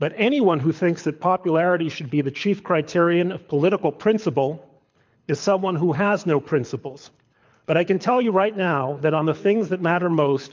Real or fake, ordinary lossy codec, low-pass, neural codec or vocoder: fake; MP3, 64 kbps; 7.2 kHz; codec, 24 kHz, 6 kbps, HILCodec